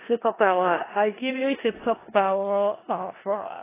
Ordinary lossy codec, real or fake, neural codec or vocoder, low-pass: AAC, 16 kbps; fake; codec, 16 kHz in and 24 kHz out, 0.4 kbps, LongCat-Audio-Codec, four codebook decoder; 3.6 kHz